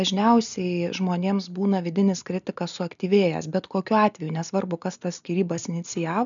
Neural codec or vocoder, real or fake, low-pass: none; real; 7.2 kHz